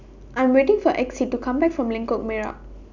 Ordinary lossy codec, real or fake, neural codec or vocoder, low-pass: none; real; none; 7.2 kHz